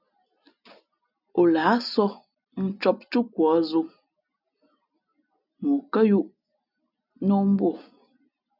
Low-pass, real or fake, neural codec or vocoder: 5.4 kHz; real; none